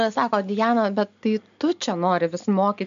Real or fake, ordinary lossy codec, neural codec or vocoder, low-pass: real; MP3, 64 kbps; none; 7.2 kHz